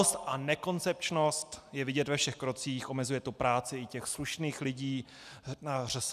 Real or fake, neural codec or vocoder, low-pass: real; none; 14.4 kHz